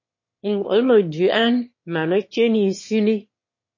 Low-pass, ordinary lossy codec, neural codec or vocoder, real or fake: 7.2 kHz; MP3, 32 kbps; autoencoder, 22.05 kHz, a latent of 192 numbers a frame, VITS, trained on one speaker; fake